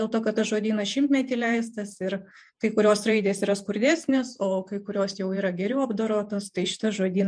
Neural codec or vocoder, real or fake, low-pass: vocoder, 48 kHz, 128 mel bands, Vocos; fake; 9.9 kHz